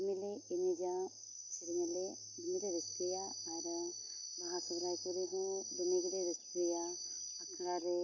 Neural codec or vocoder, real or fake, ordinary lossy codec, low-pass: none; real; MP3, 48 kbps; 7.2 kHz